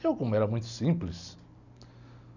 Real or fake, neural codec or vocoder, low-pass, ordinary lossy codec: real; none; 7.2 kHz; none